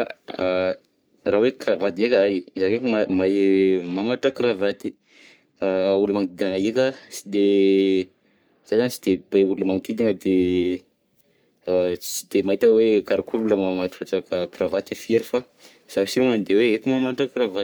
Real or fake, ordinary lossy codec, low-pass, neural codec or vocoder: fake; none; none; codec, 44.1 kHz, 3.4 kbps, Pupu-Codec